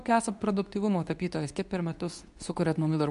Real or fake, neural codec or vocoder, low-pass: fake; codec, 24 kHz, 0.9 kbps, WavTokenizer, medium speech release version 2; 10.8 kHz